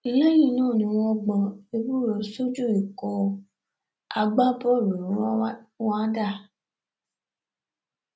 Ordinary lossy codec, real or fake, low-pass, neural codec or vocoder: none; real; none; none